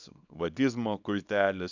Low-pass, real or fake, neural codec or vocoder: 7.2 kHz; fake; codec, 24 kHz, 0.9 kbps, WavTokenizer, small release